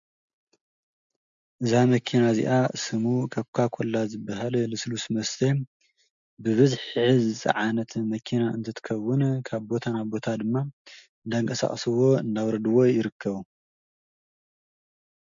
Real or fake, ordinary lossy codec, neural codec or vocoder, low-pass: real; MP3, 64 kbps; none; 7.2 kHz